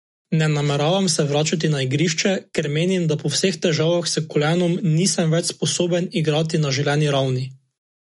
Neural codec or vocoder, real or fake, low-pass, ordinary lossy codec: none; real; 19.8 kHz; MP3, 48 kbps